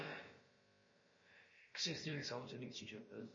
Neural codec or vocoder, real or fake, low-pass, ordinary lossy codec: codec, 16 kHz, about 1 kbps, DyCAST, with the encoder's durations; fake; 7.2 kHz; MP3, 32 kbps